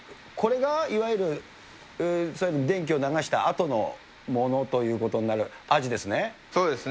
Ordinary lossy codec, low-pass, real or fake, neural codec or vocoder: none; none; real; none